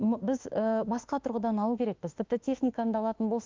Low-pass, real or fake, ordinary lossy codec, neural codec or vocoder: 7.2 kHz; fake; Opus, 32 kbps; autoencoder, 48 kHz, 32 numbers a frame, DAC-VAE, trained on Japanese speech